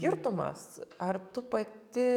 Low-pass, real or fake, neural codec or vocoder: 19.8 kHz; fake; codec, 44.1 kHz, 7.8 kbps, DAC